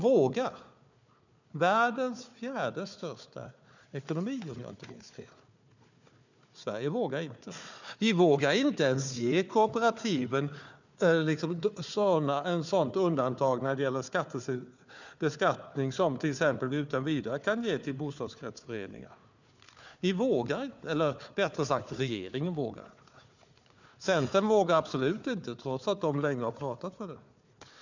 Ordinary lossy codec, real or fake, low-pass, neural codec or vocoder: none; fake; 7.2 kHz; codec, 16 kHz, 4 kbps, FunCodec, trained on Chinese and English, 50 frames a second